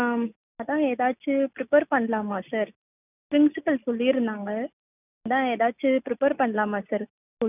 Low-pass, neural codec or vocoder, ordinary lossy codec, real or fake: 3.6 kHz; none; none; real